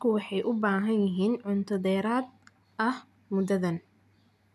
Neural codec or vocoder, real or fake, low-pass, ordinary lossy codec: none; real; 14.4 kHz; none